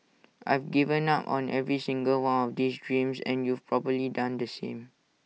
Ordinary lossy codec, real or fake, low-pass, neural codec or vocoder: none; real; none; none